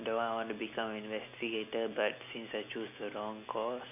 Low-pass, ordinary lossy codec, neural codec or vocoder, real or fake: 3.6 kHz; MP3, 32 kbps; none; real